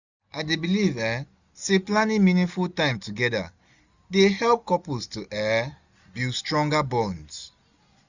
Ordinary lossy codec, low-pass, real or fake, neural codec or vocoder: none; 7.2 kHz; real; none